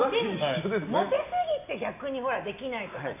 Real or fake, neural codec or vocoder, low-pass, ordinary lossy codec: real; none; 3.6 kHz; none